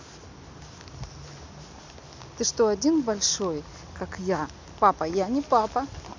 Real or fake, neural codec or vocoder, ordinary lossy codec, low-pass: real; none; MP3, 64 kbps; 7.2 kHz